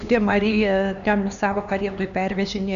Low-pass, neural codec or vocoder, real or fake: 7.2 kHz; codec, 16 kHz, 2 kbps, X-Codec, HuBERT features, trained on LibriSpeech; fake